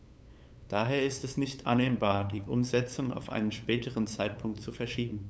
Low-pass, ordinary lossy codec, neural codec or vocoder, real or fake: none; none; codec, 16 kHz, 8 kbps, FunCodec, trained on LibriTTS, 25 frames a second; fake